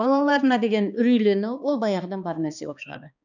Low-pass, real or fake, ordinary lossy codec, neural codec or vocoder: 7.2 kHz; fake; none; codec, 16 kHz, 2 kbps, X-Codec, HuBERT features, trained on balanced general audio